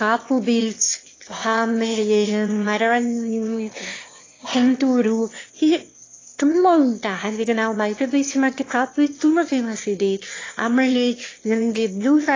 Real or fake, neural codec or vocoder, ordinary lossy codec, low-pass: fake; autoencoder, 22.05 kHz, a latent of 192 numbers a frame, VITS, trained on one speaker; AAC, 32 kbps; 7.2 kHz